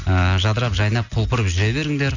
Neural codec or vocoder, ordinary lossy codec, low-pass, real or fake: none; none; 7.2 kHz; real